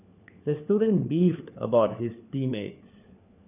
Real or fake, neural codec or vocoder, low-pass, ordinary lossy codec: fake; codec, 16 kHz, 4 kbps, FunCodec, trained on LibriTTS, 50 frames a second; 3.6 kHz; none